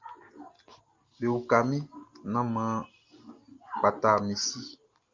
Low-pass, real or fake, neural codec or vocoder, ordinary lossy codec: 7.2 kHz; real; none; Opus, 24 kbps